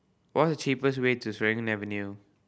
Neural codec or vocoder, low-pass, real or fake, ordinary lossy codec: none; none; real; none